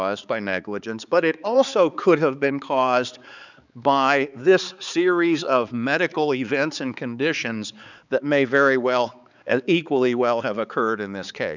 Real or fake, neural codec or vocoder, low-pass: fake; codec, 16 kHz, 4 kbps, X-Codec, HuBERT features, trained on balanced general audio; 7.2 kHz